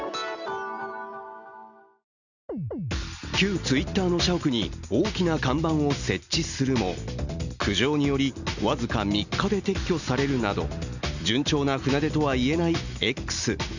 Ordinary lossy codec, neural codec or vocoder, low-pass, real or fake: none; none; 7.2 kHz; real